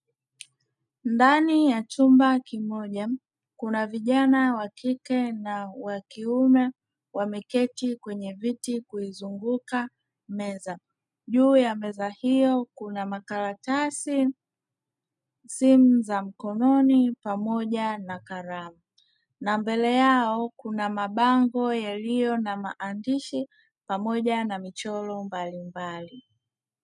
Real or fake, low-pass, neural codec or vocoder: real; 10.8 kHz; none